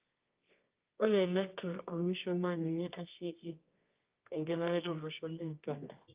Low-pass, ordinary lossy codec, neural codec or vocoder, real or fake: 3.6 kHz; Opus, 24 kbps; codec, 24 kHz, 0.9 kbps, WavTokenizer, medium music audio release; fake